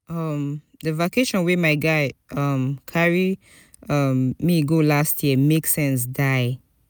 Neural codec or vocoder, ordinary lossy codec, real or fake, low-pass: none; none; real; none